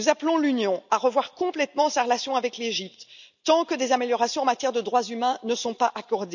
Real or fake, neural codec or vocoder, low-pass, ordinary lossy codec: real; none; 7.2 kHz; none